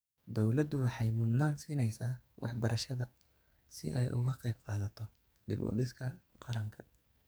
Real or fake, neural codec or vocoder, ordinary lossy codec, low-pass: fake; codec, 44.1 kHz, 2.6 kbps, SNAC; none; none